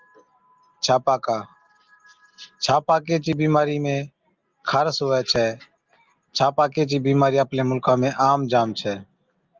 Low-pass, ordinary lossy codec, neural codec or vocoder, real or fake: 7.2 kHz; Opus, 24 kbps; none; real